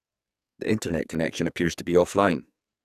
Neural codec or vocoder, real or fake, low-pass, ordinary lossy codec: codec, 44.1 kHz, 2.6 kbps, SNAC; fake; 14.4 kHz; none